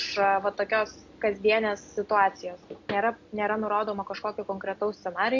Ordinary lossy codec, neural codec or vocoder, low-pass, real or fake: AAC, 48 kbps; none; 7.2 kHz; real